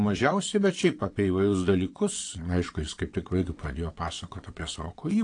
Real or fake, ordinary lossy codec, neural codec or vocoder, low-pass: fake; AAC, 48 kbps; vocoder, 22.05 kHz, 80 mel bands, WaveNeXt; 9.9 kHz